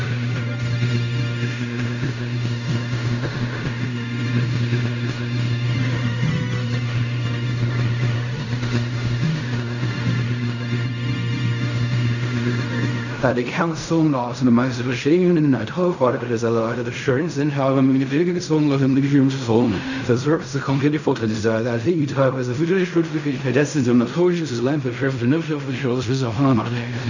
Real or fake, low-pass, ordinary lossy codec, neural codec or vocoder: fake; 7.2 kHz; none; codec, 16 kHz in and 24 kHz out, 0.4 kbps, LongCat-Audio-Codec, fine tuned four codebook decoder